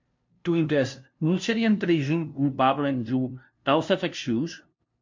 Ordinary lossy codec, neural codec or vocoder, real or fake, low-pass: MP3, 48 kbps; codec, 16 kHz, 0.5 kbps, FunCodec, trained on LibriTTS, 25 frames a second; fake; 7.2 kHz